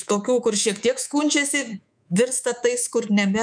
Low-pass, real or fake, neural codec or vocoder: 9.9 kHz; fake; codec, 24 kHz, 3.1 kbps, DualCodec